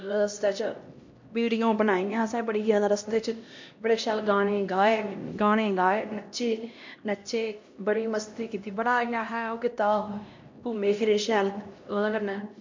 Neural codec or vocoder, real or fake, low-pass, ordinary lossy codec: codec, 16 kHz, 1 kbps, X-Codec, HuBERT features, trained on LibriSpeech; fake; 7.2 kHz; MP3, 48 kbps